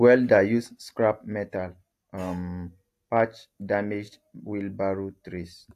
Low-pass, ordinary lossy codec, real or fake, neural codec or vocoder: 14.4 kHz; AAC, 64 kbps; real; none